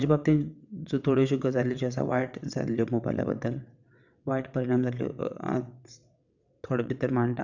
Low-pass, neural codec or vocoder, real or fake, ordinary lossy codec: 7.2 kHz; vocoder, 22.05 kHz, 80 mel bands, WaveNeXt; fake; none